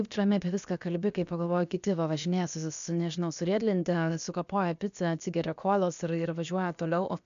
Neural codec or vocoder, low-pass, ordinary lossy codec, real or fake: codec, 16 kHz, about 1 kbps, DyCAST, with the encoder's durations; 7.2 kHz; AAC, 96 kbps; fake